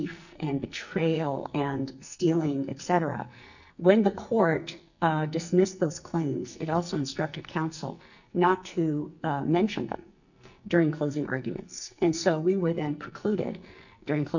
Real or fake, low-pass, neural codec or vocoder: fake; 7.2 kHz; codec, 44.1 kHz, 2.6 kbps, SNAC